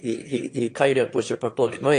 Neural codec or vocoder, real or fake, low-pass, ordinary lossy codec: autoencoder, 22.05 kHz, a latent of 192 numbers a frame, VITS, trained on one speaker; fake; 9.9 kHz; AAC, 48 kbps